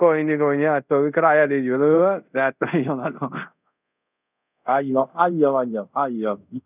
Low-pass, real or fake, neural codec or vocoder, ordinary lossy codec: 3.6 kHz; fake; codec, 24 kHz, 0.5 kbps, DualCodec; none